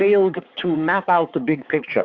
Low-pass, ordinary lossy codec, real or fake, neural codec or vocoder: 7.2 kHz; Opus, 64 kbps; fake; codec, 16 kHz, 4 kbps, X-Codec, HuBERT features, trained on balanced general audio